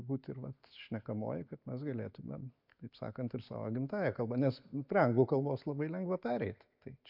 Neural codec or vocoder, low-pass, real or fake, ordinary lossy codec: none; 5.4 kHz; real; MP3, 48 kbps